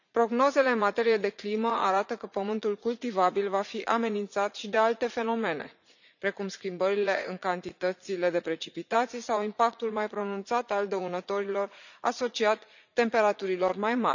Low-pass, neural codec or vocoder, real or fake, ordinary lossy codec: 7.2 kHz; vocoder, 44.1 kHz, 80 mel bands, Vocos; fake; none